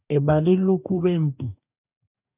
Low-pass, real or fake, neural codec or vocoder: 3.6 kHz; fake; codec, 44.1 kHz, 2.6 kbps, DAC